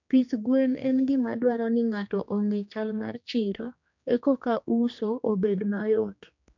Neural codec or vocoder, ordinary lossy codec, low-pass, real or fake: codec, 16 kHz, 2 kbps, X-Codec, HuBERT features, trained on general audio; none; 7.2 kHz; fake